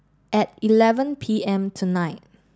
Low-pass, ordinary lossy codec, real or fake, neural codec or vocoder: none; none; real; none